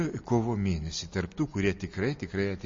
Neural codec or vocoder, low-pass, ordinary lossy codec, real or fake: none; 7.2 kHz; MP3, 32 kbps; real